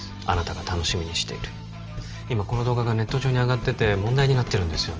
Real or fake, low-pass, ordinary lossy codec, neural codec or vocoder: real; 7.2 kHz; Opus, 24 kbps; none